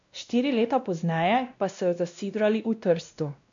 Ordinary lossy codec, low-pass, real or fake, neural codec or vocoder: MP3, 64 kbps; 7.2 kHz; fake; codec, 16 kHz, 1 kbps, X-Codec, WavLM features, trained on Multilingual LibriSpeech